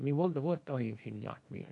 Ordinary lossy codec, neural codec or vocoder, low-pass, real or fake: AAC, 48 kbps; codec, 24 kHz, 0.9 kbps, WavTokenizer, small release; 10.8 kHz; fake